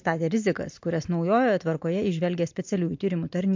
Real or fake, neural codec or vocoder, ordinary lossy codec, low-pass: real; none; MP3, 48 kbps; 7.2 kHz